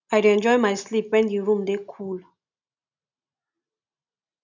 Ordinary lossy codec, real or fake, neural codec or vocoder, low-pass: none; real; none; 7.2 kHz